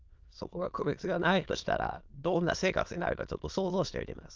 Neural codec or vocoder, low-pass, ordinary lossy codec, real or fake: autoencoder, 22.05 kHz, a latent of 192 numbers a frame, VITS, trained on many speakers; 7.2 kHz; Opus, 32 kbps; fake